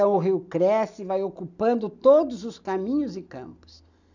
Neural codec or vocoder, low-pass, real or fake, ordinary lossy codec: none; 7.2 kHz; real; none